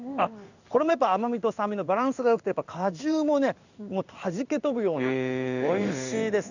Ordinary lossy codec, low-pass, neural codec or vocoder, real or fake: none; 7.2 kHz; codec, 16 kHz in and 24 kHz out, 1 kbps, XY-Tokenizer; fake